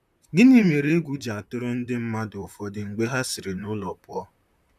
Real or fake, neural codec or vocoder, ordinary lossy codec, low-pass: fake; vocoder, 44.1 kHz, 128 mel bands, Pupu-Vocoder; none; 14.4 kHz